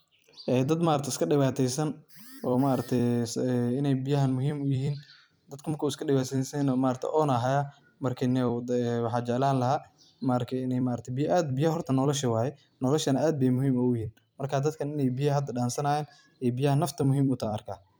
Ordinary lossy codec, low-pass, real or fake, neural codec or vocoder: none; none; fake; vocoder, 44.1 kHz, 128 mel bands every 256 samples, BigVGAN v2